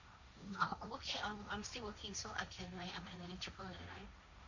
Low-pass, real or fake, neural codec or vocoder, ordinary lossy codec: 7.2 kHz; fake; codec, 16 kHz, 1.1 kbps, Voila-Tokenizer; none